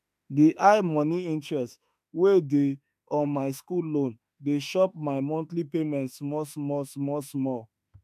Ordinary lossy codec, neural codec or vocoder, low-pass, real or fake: none; autoencoder, 48 kHz, 32 numbers a frame, DAC-VAE, trained on Japanese speech; 14.4 kHz; fake